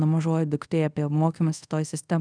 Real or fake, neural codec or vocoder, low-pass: fake; codec, 24 kHz, 0.5 kbps, DualCodec; 9.9 kHz